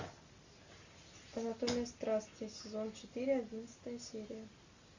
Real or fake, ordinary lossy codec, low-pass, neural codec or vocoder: real; Opus, 64 kbps; 7.2 kHz; none